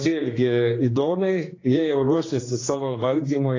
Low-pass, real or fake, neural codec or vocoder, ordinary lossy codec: 7.2 kHz; fake; codec, 16 kHz, 2 kbps, X-Codec, HuBERT features, trained on general audio; AAC, 32 kbps